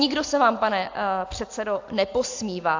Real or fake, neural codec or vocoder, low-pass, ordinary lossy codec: real; none; 7.2 kHz; AAC, 48 kbps